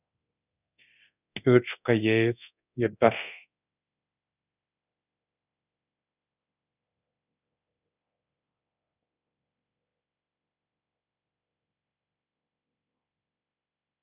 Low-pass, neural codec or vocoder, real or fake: 3.6 kHz; codec, 24 kHz, 0.9 kbps, DualCodec; fake